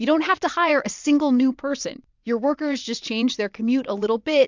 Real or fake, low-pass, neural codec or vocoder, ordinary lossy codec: fake; 7.2 kHz; vocoder, 22.05 kHz, 80 mel bands, WaveNeXt; MP3, 64 kbps